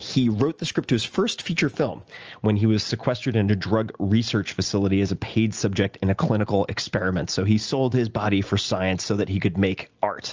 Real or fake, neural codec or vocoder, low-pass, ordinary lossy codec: real; none; 7.2 kHz; Opus, 24 kbps